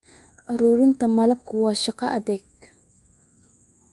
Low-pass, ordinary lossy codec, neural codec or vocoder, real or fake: 10.8 kHz; Opus, 32 kbps; codec, 24 kHz, 1.2 kbps, DualCodec; fake